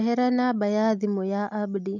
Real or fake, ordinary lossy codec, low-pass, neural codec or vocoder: real; none; 7.2 kHz; none